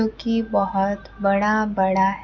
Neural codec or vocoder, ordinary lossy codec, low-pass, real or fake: none; none; 7.2 kHz; real